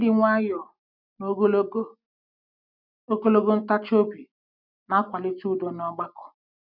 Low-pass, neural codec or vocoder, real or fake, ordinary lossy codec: 5.4 kHz; none; real; none